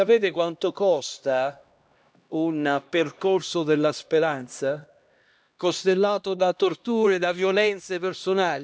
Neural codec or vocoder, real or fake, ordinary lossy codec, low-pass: codec, 16 kHz, 1 kbps, X-Codec, HuBERT features, trained on LibriSpeech; fake; none; none